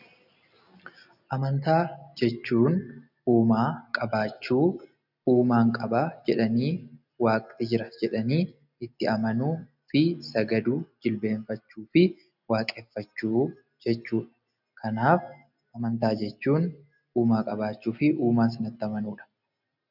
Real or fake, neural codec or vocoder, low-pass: real; none; 5.4 kHz